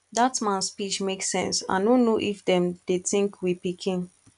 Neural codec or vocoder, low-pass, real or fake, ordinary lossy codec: none; 10.8 kHz; real; none